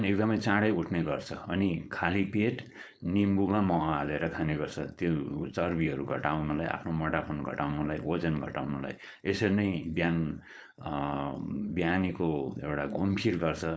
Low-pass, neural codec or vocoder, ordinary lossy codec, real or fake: none; codec, 16 kHz, 4.8 kbps, FACodec; none; fake